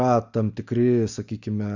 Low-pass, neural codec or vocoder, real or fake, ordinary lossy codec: 7.2 kHz; none; real; Opus, 64 kbps